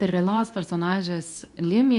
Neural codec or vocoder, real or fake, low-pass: codec, 24 kHz, 0.9 kbps, WavTokenizer, medium speech release version 2; fake; 10.8 kHz